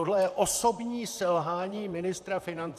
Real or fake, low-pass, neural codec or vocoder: fake; 14.4 kHz; vocoder, 44.1 kHz, 128 mel bands, Pupu-Vocoder